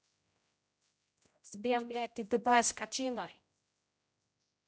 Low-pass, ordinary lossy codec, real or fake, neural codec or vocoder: none; none; fake; codec, 16 kHz, 0.5 kbps, X-Codec, HuBERT features, trained on general audio